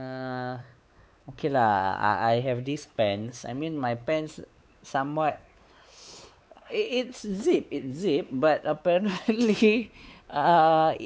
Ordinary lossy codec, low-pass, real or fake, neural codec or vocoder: none; none; fake; codec, 16 kHz, 4 kbps, X-Codec, WavLM features, trained on Multilingual LibriSpeech